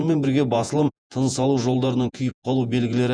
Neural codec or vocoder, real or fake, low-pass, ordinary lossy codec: vocoder, 48 kHz, 128 mel bands, Vocos; fake; 9.9 kHz; none